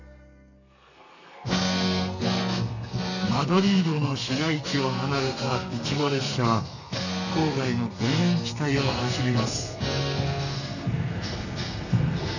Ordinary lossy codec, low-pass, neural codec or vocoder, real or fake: none; 7.2 kHz; codec, 32 kHz, 1.9 kbps, SNAC; fake